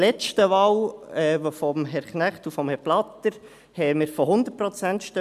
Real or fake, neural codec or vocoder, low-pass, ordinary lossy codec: real; none; 14.4 kHz; AAC, 96 kbps